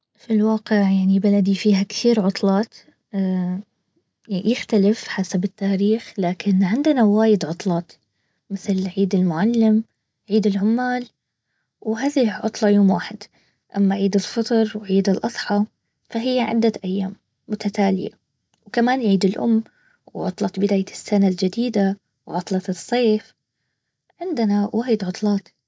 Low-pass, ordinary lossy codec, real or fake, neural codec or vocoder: none; none; real; none